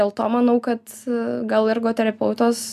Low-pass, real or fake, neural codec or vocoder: 14.4 kHz; real; none